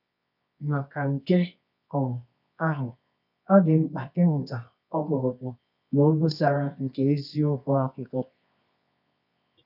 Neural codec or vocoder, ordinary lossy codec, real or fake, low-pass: codec, 24 kHz, 0.9 kbps, WavTokenizer, medium music audio release; none; fake; 5.4 kHz